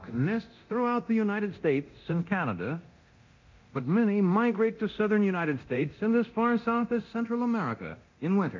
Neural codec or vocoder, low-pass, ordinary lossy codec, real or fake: codec, 24 kHz, 0.9 kbps, DualCodec; 7.2 kHz; MP3, 64 kbps; fake